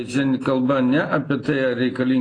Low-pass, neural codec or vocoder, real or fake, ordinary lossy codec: 9.9 kHz; none; real; AAC, 32 kbps